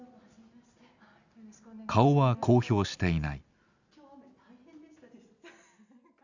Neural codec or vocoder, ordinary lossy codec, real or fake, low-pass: none; none; real; 7.2 kHz